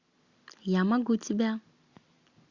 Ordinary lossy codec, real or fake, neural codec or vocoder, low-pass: Opus, 64 kbps; real; none; 7.2 kHz